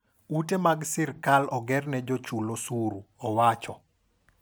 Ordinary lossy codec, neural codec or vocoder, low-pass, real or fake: none; none; none; real